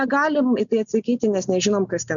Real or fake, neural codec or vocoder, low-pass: real; none; 7.2 kHz